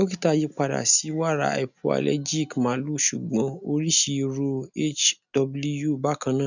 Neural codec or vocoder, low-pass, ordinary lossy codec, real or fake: none; 7.2 kHz; none; real